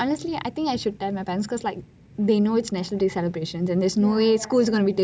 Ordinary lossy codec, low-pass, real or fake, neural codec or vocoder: none; none; real; none